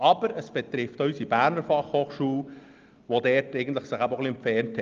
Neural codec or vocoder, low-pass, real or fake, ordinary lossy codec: none; 7.2 kHz; real; Opus, 32 kbps